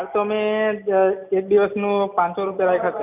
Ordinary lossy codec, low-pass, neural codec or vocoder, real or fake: none; 3.6 kHz; none; real